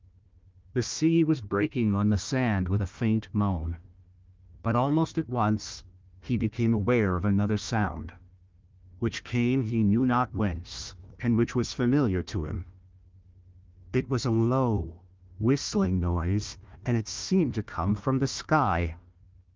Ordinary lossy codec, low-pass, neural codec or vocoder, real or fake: Opus, 32 kbps; 7.2 kHz; codec, 16 kHz, 1 kbps, FunCodec, trained on Chinese and English, 50 frames a second; fake